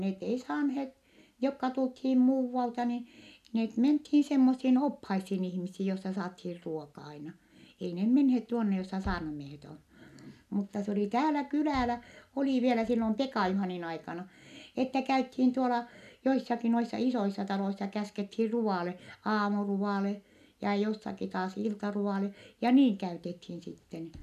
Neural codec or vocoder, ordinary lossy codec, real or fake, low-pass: none; none; real; 14.4 kHz